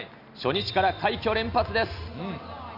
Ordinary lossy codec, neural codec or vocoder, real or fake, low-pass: none; none; real; 5.4 kHz